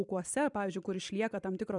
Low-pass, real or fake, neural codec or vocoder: 10.8 kHz; fake; vocoder, 44.1 kHz, 128 mel bands every 256 samples, BigVGAN v2